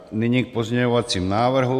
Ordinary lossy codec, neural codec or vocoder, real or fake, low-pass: AAC, 96 kbps; none; real; 14.4 kHz